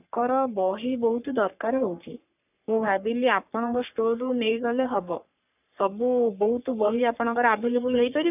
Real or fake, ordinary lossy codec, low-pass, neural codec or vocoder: fake; none; 3.6 kHz; codec, 44.1 kHz, 3.4 kbps, Pupu-Codec